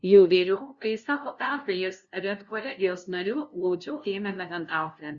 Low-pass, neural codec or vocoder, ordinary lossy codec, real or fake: 7.2 kHz; codec, 16 kHz, 0.5 kbps, FunCodec, trained on LibriTTS, 25 frames a second; Opus, 64 kbps; fake